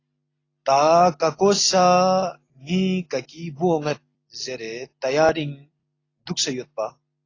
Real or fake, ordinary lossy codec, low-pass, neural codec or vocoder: real; AAC, 32 kbps; 7.2 kHz; none